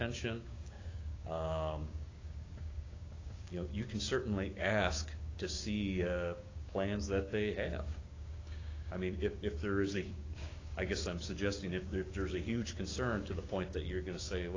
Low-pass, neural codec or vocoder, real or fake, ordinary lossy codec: 7.2 kHz; codec, 16 kHz, 6 kbps, DAC; fake; AAC, 32 kbps